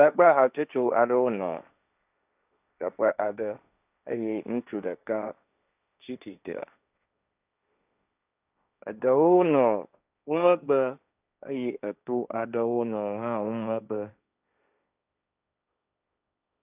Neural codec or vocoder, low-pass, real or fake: codec, 16 kHz, 1.1 kbps, Voila-Tokenizer; 3.6 kHz; fake